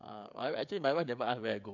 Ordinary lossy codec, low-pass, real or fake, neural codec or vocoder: MP3, 64 kbps; 7.2 kHz; fake; codec, 16 kHz, 16 kbps, FreqCodec, smaller model